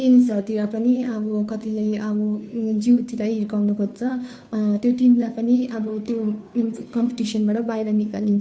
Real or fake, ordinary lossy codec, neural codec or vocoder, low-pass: fake; none; codec, 16 kHz, 2 kbps, FunCodec, trained on Chinese and English, 25 frames a second; none